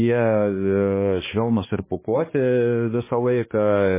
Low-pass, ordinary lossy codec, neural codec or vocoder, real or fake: 3.6 kHz; MP3, 16 kbps; codec, 16 kHz, 2 kbps, X-Codec, HuBERT features, trained on balanced general audio; fake